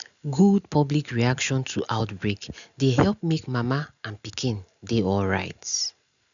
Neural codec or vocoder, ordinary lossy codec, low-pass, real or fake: none; none; 7.2 kHz; real